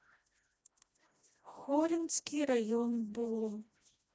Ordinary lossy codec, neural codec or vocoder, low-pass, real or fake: none; codec, 16 kHz, 1 kbps, FreqCodec, smaller model; none; fake